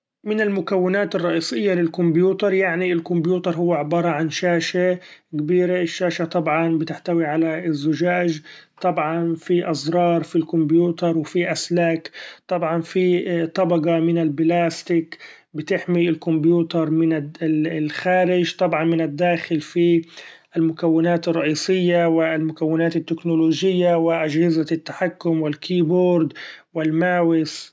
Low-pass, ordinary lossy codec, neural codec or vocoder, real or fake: none; none; none; real